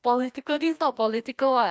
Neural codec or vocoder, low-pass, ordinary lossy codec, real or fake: codec, 16 kHz, 1 kbps, FreqCodec, larger model; none; none; fake